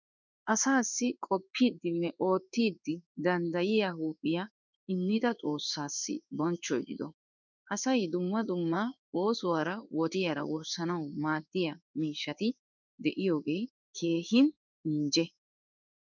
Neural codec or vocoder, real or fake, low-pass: codec, 16 kHz in and 24 kHz out, 1 kbps, XY-Tokenizer; fake; 7.2 kHz